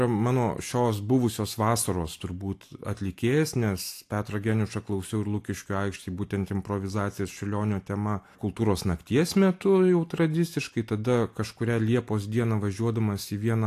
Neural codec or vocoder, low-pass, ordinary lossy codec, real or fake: none; 14.4 kHz; AAC, 64 kbps; real